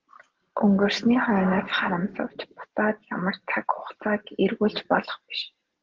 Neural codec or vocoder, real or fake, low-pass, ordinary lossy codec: none; real; 7.2 kHz; Opus, 16 kbps